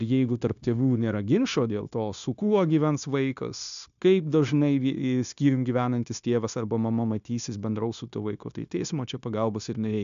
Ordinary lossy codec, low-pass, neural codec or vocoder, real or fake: MP3, 96 kbps; 7.2 kHz; codec, 16 kHz, 0.9 kbps, LongCat-Audio-Codec; fake